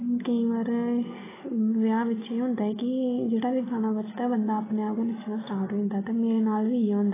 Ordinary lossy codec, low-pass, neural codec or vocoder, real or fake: AAC, 16 kbps; 3.6 kHz; none; real